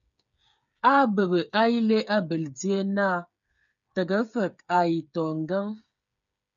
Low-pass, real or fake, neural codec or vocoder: 7.2 kHz; fake; codec, 16 kHz, 8 kbps, FreqCodec, smaller model